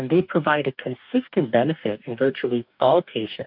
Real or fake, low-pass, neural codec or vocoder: fake; 5.4 kHz; codec, 44.1 kHz, 2.6 kbps, DAC